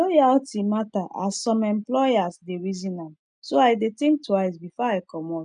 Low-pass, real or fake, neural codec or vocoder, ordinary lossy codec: 9.9 kHz; real; none; none